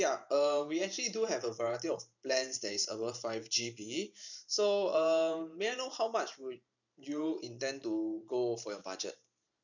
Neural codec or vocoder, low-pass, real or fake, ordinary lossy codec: vocoder, 44.1 kHz, 128 mel bands every 512 samples, BigVGAN v2; 7.2 kHz; fake; none